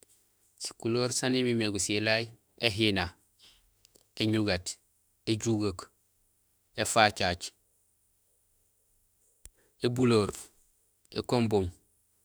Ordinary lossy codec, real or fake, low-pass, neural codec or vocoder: none; fake; none; autoencoder, 48 kHz, 32 numbers a frame, DAC-VAE, trained on Japanese speech